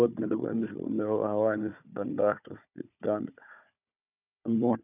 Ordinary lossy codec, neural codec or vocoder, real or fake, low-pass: none; codec, 16 kHz, 4 kbps, FunCodec, trained on Chinese and English, 50 frames a second; fake; 3.6 kHz